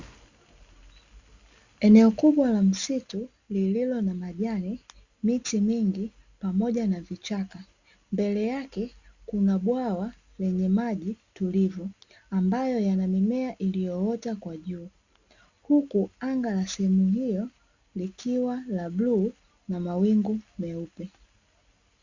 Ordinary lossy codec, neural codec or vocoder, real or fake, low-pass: Opus, 64 kbps; none; real; 7.2 kHz